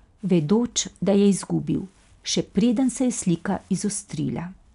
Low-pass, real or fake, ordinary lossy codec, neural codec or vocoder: 10.8 kHz; real; none; none